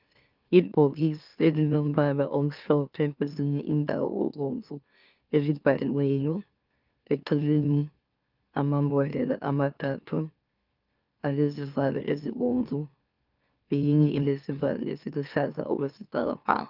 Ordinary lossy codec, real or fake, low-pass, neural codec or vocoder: Opus, 24 kbps; fake; 5.4 kHz; autoencoder, 44.1 kHz, a latent of 192 numbers a frame, MeloTTS